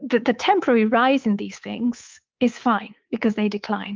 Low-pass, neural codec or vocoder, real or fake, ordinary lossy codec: 7.2 kHz; codec, 24 kHz, 3.1 kbps, DualCodec; fake; Opus, 32 kbps